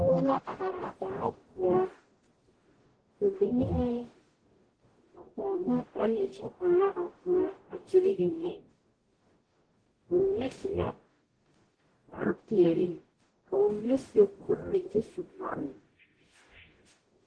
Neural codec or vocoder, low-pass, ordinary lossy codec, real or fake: codec, 44.1 kHz, 0.9 kbps, DAC; 9.9 kHz; Opus, 16 kbps; fake